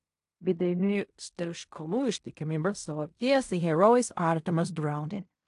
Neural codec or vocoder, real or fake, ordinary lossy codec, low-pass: codec, 16 kHz in and 24 kHz out, 0.4 kbps, LongCat-Audio-Codec, fine tuned four codebook decoder; fake; AAC, 64 kbps; 10.8 kHz